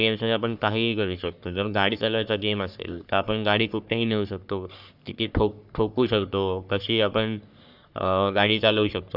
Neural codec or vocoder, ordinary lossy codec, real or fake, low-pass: codec, 44.1 kHz, 3.4 kbps, Pupu-Codec; none; fake; 5.4 kHz